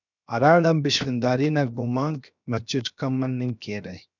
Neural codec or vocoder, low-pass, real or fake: codec, 16 kHz, 0.7 kbps, FocalCodec; 7.2 kHz; fake